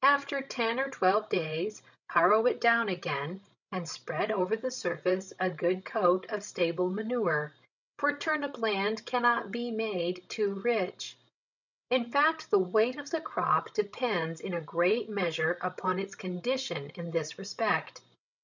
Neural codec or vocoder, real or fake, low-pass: codec, 16 kHz, 16 kbps, FreqCodec, larger model; fake; 7.2 kHz